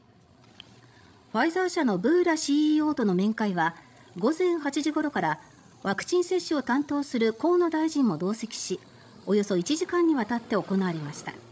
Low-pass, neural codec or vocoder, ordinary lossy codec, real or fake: none; codec, 16 kHz, 16 kbps, FreqCodec, larger model; none; fake